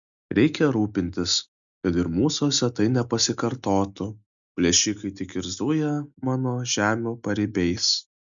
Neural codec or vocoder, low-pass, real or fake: none; 7.2 kHz; real